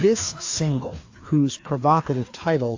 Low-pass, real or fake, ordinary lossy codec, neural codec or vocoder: 7.2 kHz; fake; AAC, 48 kbps; codec, 16 kHz, 2 kbps, FreqCodec, larger model